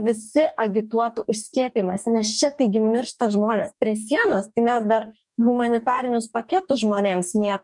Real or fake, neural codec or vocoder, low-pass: fake; codec, 44.1 kHz, 2.6 kbps, DAC; 10.8 kHz